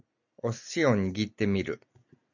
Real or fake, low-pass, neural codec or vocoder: real; 7.2 kHz; none